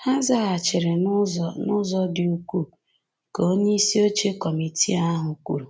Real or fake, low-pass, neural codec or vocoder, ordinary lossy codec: real; none; none; none